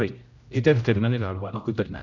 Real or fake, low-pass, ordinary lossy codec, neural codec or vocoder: fake; 7.2 kHz; none; codec, 16 kHz, 0.5 kbps, X-Codec, HuBERT features, trained on general audio